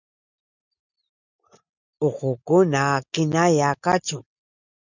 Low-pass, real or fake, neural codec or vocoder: 7.2 kHz; real; none